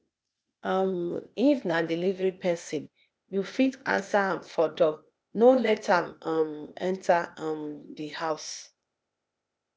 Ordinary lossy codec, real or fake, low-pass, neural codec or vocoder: none; fake; none; codec, 16 kHz, 0.8 kbps, ZipCodec